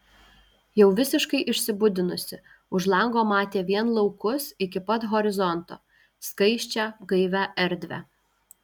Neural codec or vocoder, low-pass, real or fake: none; 19.8 kHz; real